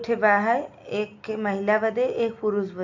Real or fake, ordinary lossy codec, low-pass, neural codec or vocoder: real; AAC, 48 kbps; 7.2 kHz; none